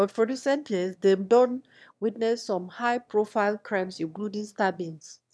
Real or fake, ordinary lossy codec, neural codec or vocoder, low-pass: fake; none; autoencoder, 22.05 kHz, a latent of 192 numbers a frame, VITS, trained on one speaker; none